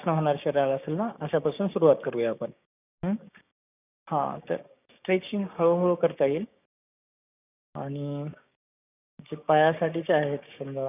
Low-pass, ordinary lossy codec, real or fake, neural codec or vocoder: 3.6 kHz; none; fake; codec, 16 kHz, 6 kbps, DAC